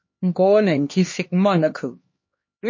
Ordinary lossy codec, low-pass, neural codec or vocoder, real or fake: MP3, 32 kbps; 7.2 kHz; codec, 16 kHz, 2 kbps, X-Codec, HuBERT features, trained on LibriSpeech; fake